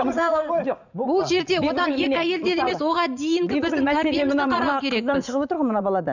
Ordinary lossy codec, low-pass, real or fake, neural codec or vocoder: none; 7.2 kHz; fake; vocoder, 44.1 kHz, 80 mel bands, Vocos